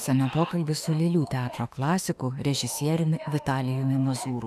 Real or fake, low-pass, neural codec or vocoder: fake; 14.4 kHz; autoencoder, 48 kHz, 32 numbers a frame, DAC-VAE, trained on Japanese speech